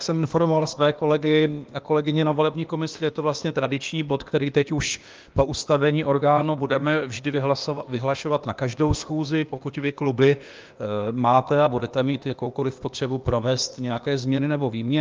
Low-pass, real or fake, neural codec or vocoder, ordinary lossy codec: 7.2 kHz; fake; codec, 16 kHz, 0.8 kbps, ZipCodec; Opus, 24 kbps